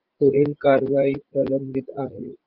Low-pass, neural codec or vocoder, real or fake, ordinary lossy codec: 5.4 kHz; vocoder, 44.1 kHz, 80 mel bands, Vocos; fake; Opus, 24 kbps